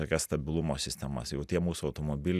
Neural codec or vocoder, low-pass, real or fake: none; 14.4 kHz; real